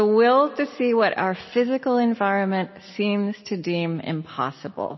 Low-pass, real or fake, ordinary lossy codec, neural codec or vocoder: 7.2 kHz; real; MP3, 24 kbps; none